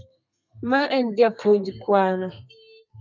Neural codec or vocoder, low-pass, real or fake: codec, 32 kHz, 1.9 kbps, SNAC; 7.2 kHz; fake